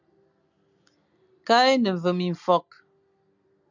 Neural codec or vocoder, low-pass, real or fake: none; 7.2 kHz; real